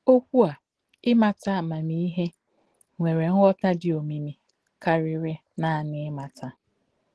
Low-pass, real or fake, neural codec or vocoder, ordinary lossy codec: 10.8 kHz; real; none; Opus, 16 kbps